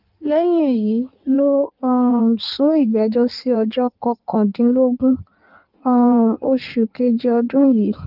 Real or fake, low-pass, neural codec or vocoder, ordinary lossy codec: fake; 5.4 kHz; codec, 16 kHz in and 24 kHz out, 1.1 kbps, FireRedTTS-2 codec; Opus, 32 kbps